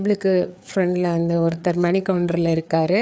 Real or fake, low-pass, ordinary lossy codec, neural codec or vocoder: fake; none; none; codec, 16 kHz, 4 kbps, FreqCodec, larger model